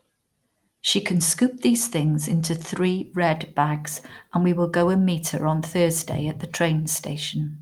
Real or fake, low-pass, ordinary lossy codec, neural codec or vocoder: real; 19.8 kHz; Opus, 32 kbps; none